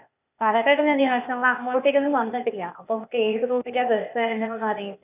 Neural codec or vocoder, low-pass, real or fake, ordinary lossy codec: codec, 16 kHz, 0.8 kbps, ZipCodec; 3.6 kHz; fake; none